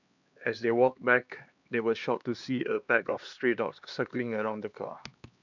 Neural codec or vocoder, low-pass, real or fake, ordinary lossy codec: codec, 16 kHz, 2 kbps, X-Codec, HuBERT features, trained on LibriSpeech; 7.2 kHz; fake; none